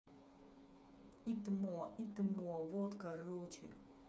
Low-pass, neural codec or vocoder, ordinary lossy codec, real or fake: none; codec, 16 kHz, 4 kbps, FreqCodec, smaller model; none; fake